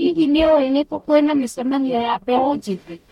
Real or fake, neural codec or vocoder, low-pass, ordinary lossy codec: fake; codec, 44.1 kHz, 0.9 kbps, DAC; 19.8 kHz; MP3, 64 kbps